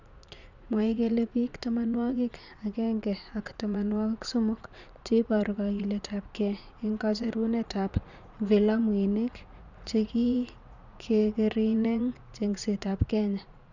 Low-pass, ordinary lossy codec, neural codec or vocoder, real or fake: 7.2 kHz; none; vocoder, 44.1 kHz, 80 mel bands, Vocos; fake